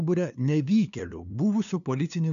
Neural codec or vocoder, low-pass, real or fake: codec, 16 kHz, 2 kbps, FunCodec, trained on LibriTTS, 25 frames a second; 7.2 kHz; fake